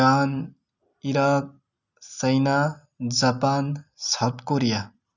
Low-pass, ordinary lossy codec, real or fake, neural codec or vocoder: 7.2 kHz; none; real; none